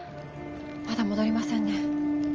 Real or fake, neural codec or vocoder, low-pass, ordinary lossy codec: real; none; 7.2 kHz; Opus, 24 kbps